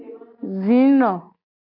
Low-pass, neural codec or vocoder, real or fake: 5.4 kHz; none; real